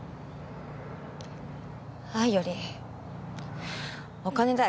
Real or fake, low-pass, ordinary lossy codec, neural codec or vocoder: real; none; none; none